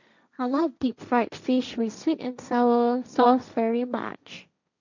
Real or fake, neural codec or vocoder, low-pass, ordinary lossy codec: fake; codec, 16 kHz, 1.1 kbps, Voila-Tokenizer; 7.2 kHz; none